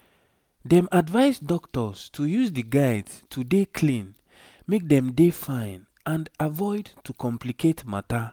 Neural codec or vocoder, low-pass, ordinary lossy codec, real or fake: none; none; none; real